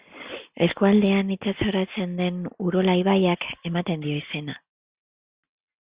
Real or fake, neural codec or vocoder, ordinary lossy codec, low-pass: real; none; Opus, 64 kbps; 3.6 kHz